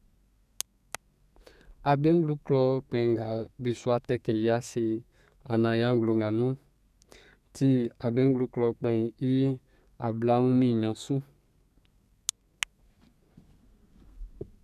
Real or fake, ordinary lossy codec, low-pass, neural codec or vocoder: fake; none; 14.4 kHz; codec, 32 kHz, 1.9 kbps, SNAC